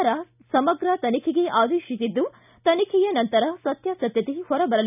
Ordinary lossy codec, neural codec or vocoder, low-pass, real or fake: none; none; 3.6 kHz; real